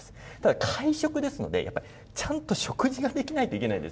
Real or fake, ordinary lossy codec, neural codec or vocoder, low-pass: real; none; none; none